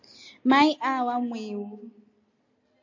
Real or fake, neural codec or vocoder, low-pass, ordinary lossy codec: real; none; 7.2 kHz; AAC, 48 kbps